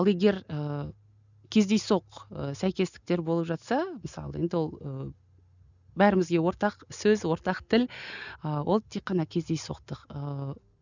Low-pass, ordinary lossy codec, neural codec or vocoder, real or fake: 7.2 kHz; none; none; real